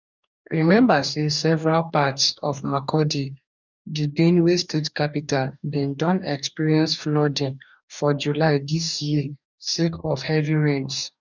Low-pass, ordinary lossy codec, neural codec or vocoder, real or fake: 7.2 kHz; none; codec, 44.1 kHz, 2.6 kbps, DAC; fake